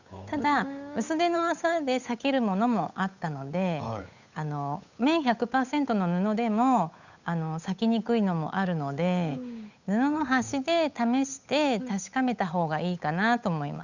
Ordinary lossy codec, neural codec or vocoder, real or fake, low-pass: none; codec, 16 kHz, 8 kbps, FunCodec, trained on Chinese and English, 25 frames a second; fake; 7.2 kHz